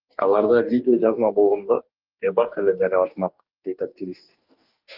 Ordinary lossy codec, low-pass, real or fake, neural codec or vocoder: Opus, 32 kbps; 5.4 kHz; fake; codec, 44.1 kHz, 2.6 kbps, DAC